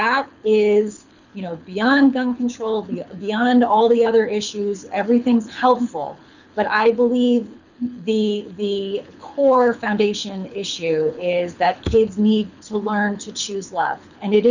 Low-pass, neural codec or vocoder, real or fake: 7.2 kHz; codec, 24 kHz, 6 kbps, HILCodec; fake